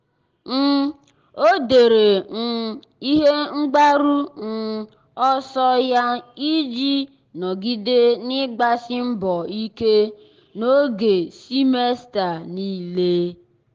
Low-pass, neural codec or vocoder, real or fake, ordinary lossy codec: 7.2 kHz; none; real; Opus, 16 kbps